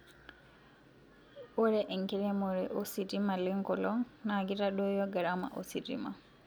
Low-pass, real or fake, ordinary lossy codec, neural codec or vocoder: none; real; none; none